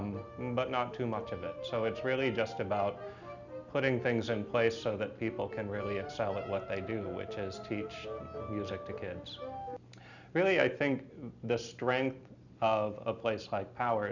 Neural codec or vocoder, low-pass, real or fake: none; 7.2 kHz; real